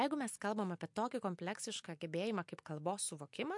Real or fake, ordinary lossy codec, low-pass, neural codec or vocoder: real; MP3, 64 kbps; 10.8 kHz; none